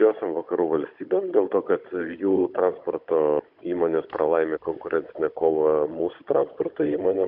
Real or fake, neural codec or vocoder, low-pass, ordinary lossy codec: fake; codec, 16 kHz, 16 kbps, FunCodec, trained on Chinese and English, 50 frames a second; 5.4 kHz; MP3, 48 kbps